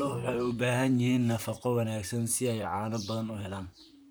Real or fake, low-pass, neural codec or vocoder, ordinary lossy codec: fake; none; vocoder, 44.1 kHz, 128 mel bands, Pupu-Vocoder; none